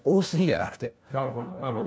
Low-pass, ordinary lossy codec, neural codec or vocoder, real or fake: none; none; codec, 16 kHz, 1 kbps, FunCodec, trained on LibriTTS, 50 frames a second; fake